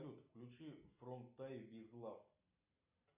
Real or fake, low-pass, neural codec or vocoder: real; 3.6 kHz; none